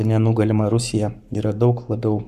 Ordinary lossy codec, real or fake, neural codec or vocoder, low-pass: Opus, 64 kbps; fake; codec, 44.1 kHz, 7.8 kbps, Pupu-Codec; 14.4 kHz